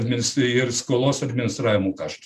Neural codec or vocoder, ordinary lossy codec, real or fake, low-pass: none; MP3, 96 kbps; real; 14.4 kHz